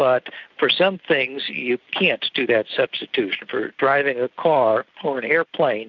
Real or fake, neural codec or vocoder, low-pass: real; none; 7.2 kHz